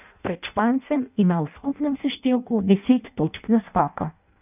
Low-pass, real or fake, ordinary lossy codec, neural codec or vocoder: 3.6 kHz; fake; none; codec, 16 kHz in and 24 kHz out, 0.6 kbps, FireRedTTS-2 codec